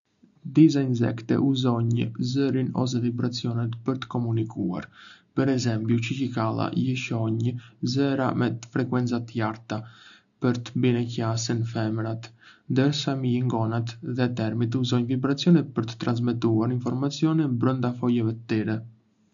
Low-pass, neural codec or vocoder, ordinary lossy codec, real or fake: 7.2 kHz; none; none; real